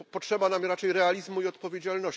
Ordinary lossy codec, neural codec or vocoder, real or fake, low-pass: none; none; real; none